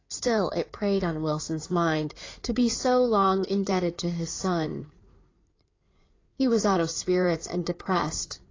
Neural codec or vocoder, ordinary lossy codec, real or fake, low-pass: codec, 16 kHz in and 24 kHz out, 2.2 kbps, FireRedTTS-2 codec; AAC, 32 kbps; fake; 7.2 kHz